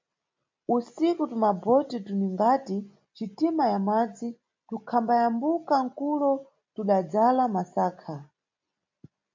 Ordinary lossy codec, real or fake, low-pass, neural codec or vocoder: MP3, 64 kbps; real; 7.2 kHz; none